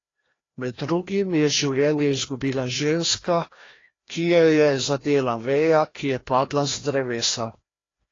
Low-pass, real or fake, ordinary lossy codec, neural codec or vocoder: 7.2 kHz; fake; AAC, 32 kbps; codec, 16 kHz, 1 kbps, FreqCodec, larger model